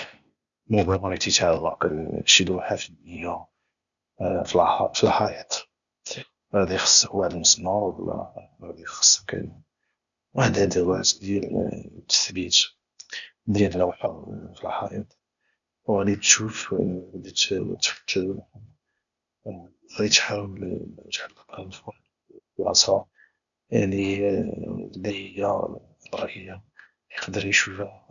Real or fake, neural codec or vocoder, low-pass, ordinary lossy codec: fake; codec, 16 kHz, 0.8 kbps, ZipCodec; 7.2 kHz; none